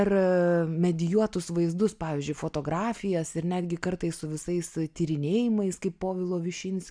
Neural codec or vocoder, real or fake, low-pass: none; real; 9.9 kHz